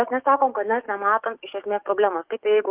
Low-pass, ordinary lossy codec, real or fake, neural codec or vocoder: 3.6 kHz; Opus, 32 kbps; fake; codec, 44.1 kHz, 7.8 kbps, DAC